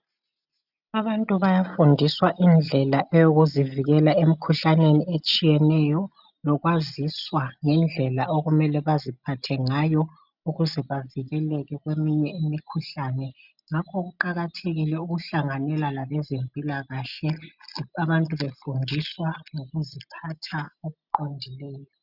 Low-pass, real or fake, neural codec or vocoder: 5.4 kHz; real; none